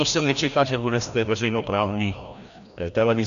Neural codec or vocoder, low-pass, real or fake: codec, 16 kHz, 1 kbps, FreqCodec, larger model; 7.2 kHz; fake